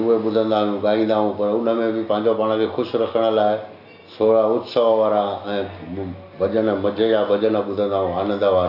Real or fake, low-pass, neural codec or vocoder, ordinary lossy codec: real; 5.4 kHz; none; none